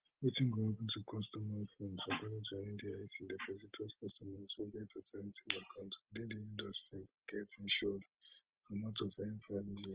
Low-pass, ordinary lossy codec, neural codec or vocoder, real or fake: 3.6 kHz; Opus, 16 kbps; none; real